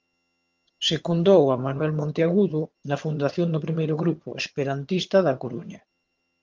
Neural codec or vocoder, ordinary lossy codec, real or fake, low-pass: vocoder, 22.05 kHz, 80 mel bands, HiFi-GAN; Opus, 32 kbps; fake; 7.2 kHz